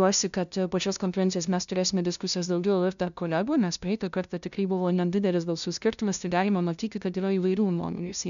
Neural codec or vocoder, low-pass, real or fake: codec, 16 kHz, 0.5 kbps, FunCodec, trained on LibriTTS, 25 frames a second; 7.2 kHz; fake